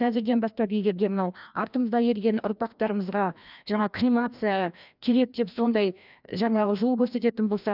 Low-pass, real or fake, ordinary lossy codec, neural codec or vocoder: 5.4 kHz; fake; none; codec, 16 kHz, 1 kbps, FreqCodec, larger model